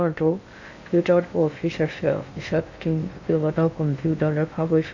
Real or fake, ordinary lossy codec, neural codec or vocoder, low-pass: fake; none; codec, 16 kHz in and 24 kHz out, 0.6 kbps, FocalCodec, streaming, 2048 codes; 7.2 kHz